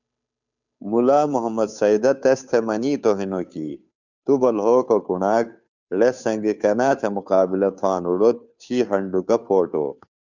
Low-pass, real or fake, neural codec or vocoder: 7.2 kHz; fake; codec, 16 kHz, 2 kbps, FunCodec, trained on Chinese and English, 25 frames a second